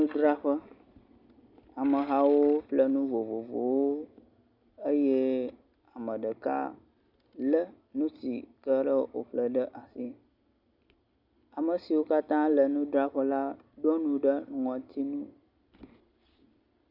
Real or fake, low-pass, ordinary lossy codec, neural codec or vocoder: real; 5.4 kHz; Opus, 64 kbps; none